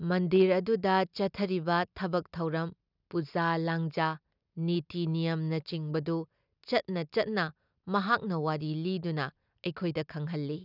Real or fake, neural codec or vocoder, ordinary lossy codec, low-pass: real; none; none; 5.4 kHz